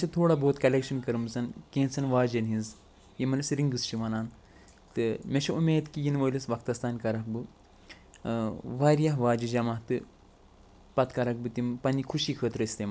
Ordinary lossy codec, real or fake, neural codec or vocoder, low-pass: none; real; none; none